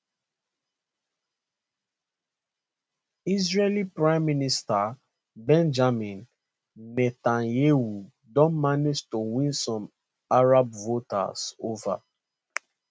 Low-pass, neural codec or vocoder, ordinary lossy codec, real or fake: none; none; none; real